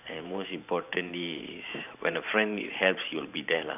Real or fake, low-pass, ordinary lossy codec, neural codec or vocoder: fake; 3.6 kHz; none; vocoder, 44.1 kHz, 128 mel bands every 512 samples, BigVGAN v2